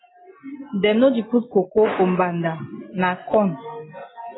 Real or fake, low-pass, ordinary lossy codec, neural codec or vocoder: real; 7.2 kHz; AAC, 16 kbps; none